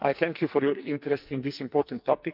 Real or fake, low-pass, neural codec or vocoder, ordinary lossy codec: fake; 5.4 kHz; codec, 44.1 kHz, 2.6 kbps, SNAC; none